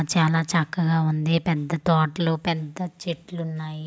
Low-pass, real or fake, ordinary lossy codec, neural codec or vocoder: none; real; none; none